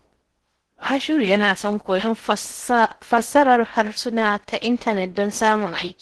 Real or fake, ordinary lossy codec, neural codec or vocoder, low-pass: fake; Opus, 16 kbps; codec, 16 kHz in and 24 kHz out, 0.6 kbps, FocalCodec, streaming, 4096 codes; 10.8 kHz